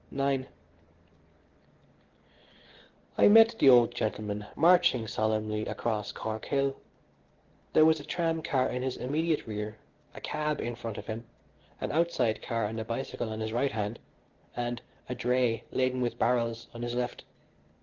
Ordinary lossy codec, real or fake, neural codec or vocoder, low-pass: Opus, 16 kbps; real; none; 7.2 kHz